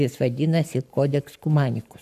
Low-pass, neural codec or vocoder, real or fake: 14.4 kHz; codec, 44.1 kHz, 7.8 kbps, Pupu-Codec; fake